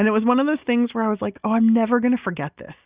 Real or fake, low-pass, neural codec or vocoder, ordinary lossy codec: real; 3.6 kHz; none; Opus, 64 kbps